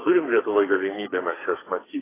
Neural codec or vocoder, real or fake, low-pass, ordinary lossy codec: codec, 44.1 kHz, 2.6 kbps, SNAC; fake; 3.6 kHz; AAC, 16 kbps